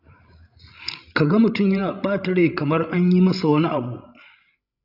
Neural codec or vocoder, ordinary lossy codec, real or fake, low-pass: codec, 16 kHz, 16 kbps, FreqCodec, larger model; none; fake; 5.4 kHz